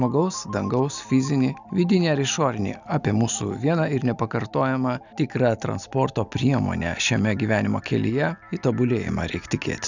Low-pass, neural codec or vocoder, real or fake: 7.2 kHz; none; real